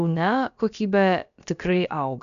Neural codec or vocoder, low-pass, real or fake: codec, 16 kHz, about 1 kbps, DyCAST, with the encoder's durations; 7.2 kHz; fake